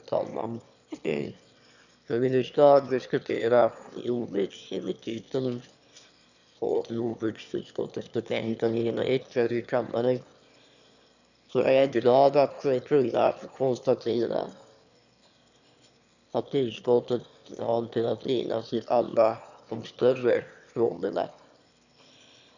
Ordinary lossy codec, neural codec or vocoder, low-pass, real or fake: none; autoencoder, 22.05 kHz, a latent of 192 numbers a frame, VITS, trained on one speaker; 7.2 kHz; fake